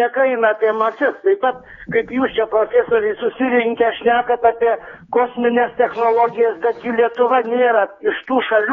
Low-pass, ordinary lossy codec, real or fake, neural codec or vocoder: 7.2 kHz; AAC, 24 kbps; fake; codec, 16 kHz, 4 kbps, X-Codec, HuBERT features, trained on general audio